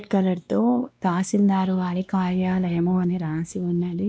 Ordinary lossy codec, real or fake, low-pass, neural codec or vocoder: none; fake; none; codec, 16 kHz, 2 kbps, X-Codec, WavLM features, trained on Multilingual LibriSpeech